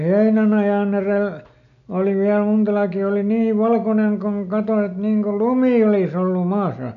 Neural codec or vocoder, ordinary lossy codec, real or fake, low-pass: none; none; real; 7.2 kHz